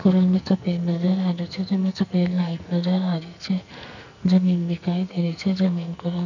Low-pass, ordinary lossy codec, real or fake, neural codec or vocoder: 7.2 kHz; none; fake; codec, 44.1 kHz, 2.6 kbps, SNAC